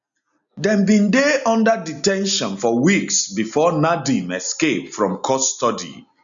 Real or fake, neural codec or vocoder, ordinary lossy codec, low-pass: real; none; none; 7.2 kHz